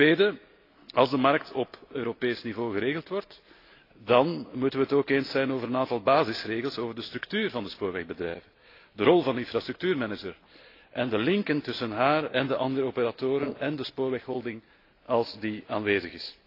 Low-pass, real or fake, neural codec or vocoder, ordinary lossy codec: 5.4 kHz; real; none; AAC, 32 kbps